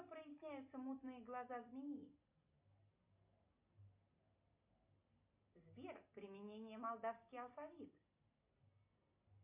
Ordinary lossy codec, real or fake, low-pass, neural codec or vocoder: MP3, 32 kbps; real; 3.6 kHz; none